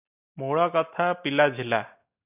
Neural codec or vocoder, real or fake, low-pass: none; real; 3.6 kHz